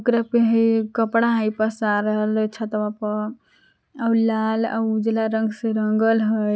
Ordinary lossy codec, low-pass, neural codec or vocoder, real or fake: none; none; none; real